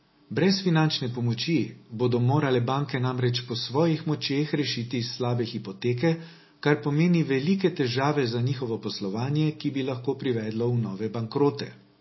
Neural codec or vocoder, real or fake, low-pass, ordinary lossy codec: none; real; 7.2 kHz; MP3, 24 kbps